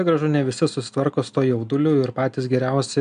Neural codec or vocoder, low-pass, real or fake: none; 9.9 kHz; real